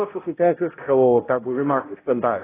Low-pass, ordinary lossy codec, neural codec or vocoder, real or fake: 3.6 kHz; AAC, 16 kbps; codec, 16 kHz, 0.5 kbps, X-Codec, HuBERT features, trained on balanced general audio; fake